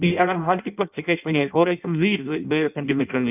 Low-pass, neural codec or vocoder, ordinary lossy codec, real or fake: 3.6 kHz; codec, 16 kHz in and 24 kHz out, 0.6 kbps, FireRedTTS-2 codec; none; fake